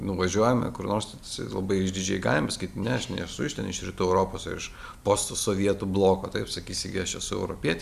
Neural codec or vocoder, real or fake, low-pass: none; real; 14.4 kHz